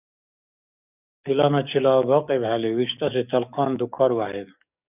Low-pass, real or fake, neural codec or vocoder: 3.6 kHz; fake; codec, 44.1 kHz, 7.8 kbps, DAC